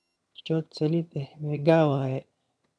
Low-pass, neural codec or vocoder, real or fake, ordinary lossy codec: none; vocoder, 22.05 kHz, 80 mel bands, HiFi-GAN; fake; none